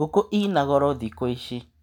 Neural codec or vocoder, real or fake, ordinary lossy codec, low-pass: none; real; none; 19.8 kHz